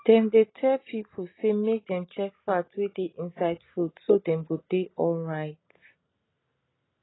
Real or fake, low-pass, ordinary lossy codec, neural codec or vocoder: real; 7.2 kHz; AAC, 16 kbps; none